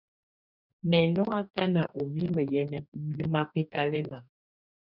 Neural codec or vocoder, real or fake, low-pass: codec, 44.1 kHz, 2.6 kbps, DAC; fake; 5.4 kHz